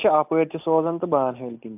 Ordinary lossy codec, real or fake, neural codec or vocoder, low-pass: AAC, 32 kbps; real; none; 3.6 kHz